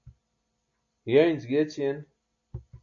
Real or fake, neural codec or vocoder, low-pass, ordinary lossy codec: real; none; 7.2 kHz; AAC, 48 kbps